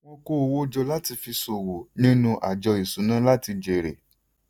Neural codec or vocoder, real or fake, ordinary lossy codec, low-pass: none; real; none; none